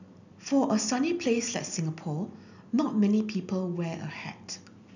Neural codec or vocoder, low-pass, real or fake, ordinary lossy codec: none; 7.2 kHz; real; none